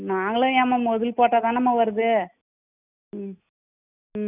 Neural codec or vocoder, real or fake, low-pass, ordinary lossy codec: none; real; 3.6 kHz; none